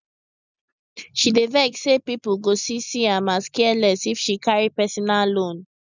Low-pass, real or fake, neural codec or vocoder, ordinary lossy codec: 7.2 kHz; real; none; none